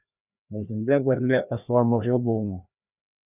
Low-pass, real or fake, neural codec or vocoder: 3.6 kHz; fake; codec, 16 kHz, 1 kbps, FreqCodec, larger model